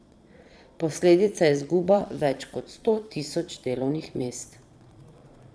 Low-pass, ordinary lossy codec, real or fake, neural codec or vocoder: none; none; fake; vocoder, 22.05 kHz, 80 mel bands, Vocos